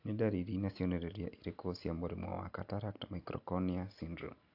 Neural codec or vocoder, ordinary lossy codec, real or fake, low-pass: none; AAC, 48 kbps; real; 5.4 kHz